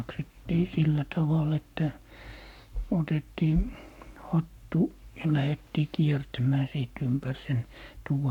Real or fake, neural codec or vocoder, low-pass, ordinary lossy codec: fake; codec, 44.1 kHz, 7.8 kbps, Pupu-Codec; 19.8 kHz; none